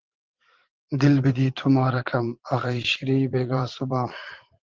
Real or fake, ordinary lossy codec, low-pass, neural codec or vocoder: fake; Opus, 16 kbps; 7.2 kHz; vocoder, 44.1 kHz, 80 mel bands, Vocos